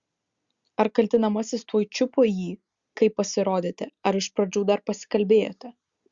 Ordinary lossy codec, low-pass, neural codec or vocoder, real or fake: Opus, 64 kbps; 7.2 kHz; none; real